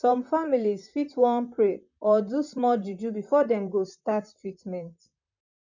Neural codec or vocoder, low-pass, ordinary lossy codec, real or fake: vocoder, 44.1 kHz, 128 mel bands, Pupu-Vocoder; 7.2 kHz; none; fake